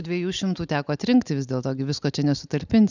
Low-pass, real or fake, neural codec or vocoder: 7.2 kHz; real; none